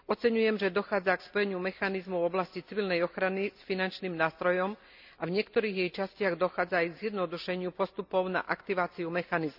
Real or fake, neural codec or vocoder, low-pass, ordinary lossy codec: real; none; 5.4 kHz; none